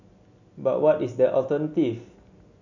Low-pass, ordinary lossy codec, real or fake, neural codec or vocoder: 7.2 kHz; none; real; none